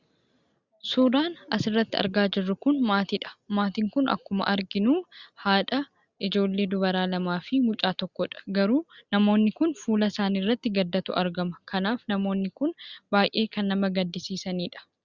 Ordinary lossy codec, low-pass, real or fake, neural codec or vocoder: Opus, 64 kbps; 7.2 kHz; real; none